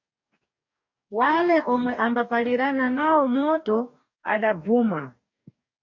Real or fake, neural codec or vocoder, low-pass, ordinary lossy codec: fake; codec, 44.1 kHz, 2.6 kbps, DAC; 7.2 kHz; MP3, 48 kbps